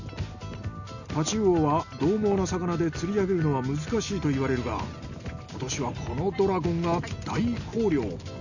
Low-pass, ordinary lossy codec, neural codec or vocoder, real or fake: 7.2 kHz; none; none; real